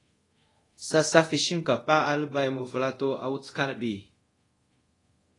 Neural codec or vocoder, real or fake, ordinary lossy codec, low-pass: codec, 24 kHz, 0.5 kbps, DualCodec; fake; AAC, 32 kbps; 10.8 kHz